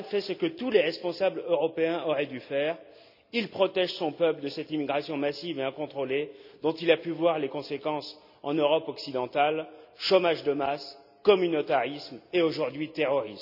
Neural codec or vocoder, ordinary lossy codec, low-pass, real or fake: none; none; 5.4 kHz; real